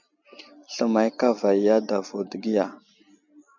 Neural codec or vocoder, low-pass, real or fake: none; 7.2 kHz; real